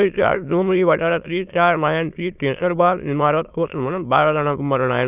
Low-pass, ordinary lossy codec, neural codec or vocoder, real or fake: 3.6 kHz; none; autoencoder, 22.05 kHz, a latent of 192 numbers a frame, VITS, trained on many speakers; fake